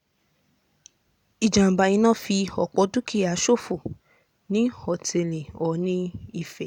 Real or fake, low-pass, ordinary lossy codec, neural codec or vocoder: real; 19.8 kHz; none; none